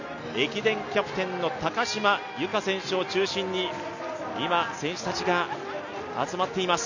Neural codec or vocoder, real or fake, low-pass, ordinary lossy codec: none; real; 7.2 kHz; none